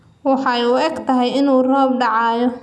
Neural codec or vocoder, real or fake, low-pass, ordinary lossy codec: none; real; none; none